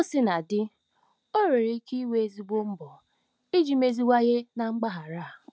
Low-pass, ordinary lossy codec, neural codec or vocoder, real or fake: none; none; none; real